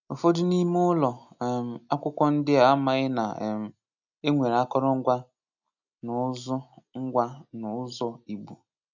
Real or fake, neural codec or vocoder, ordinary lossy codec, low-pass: real; none; none; 7.2 kHz